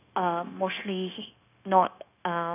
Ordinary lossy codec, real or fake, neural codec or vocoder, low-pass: none; fake; codec, 16 kHz, 0.9 kbps, LongCat-Audio-Codec; 3.6 kHz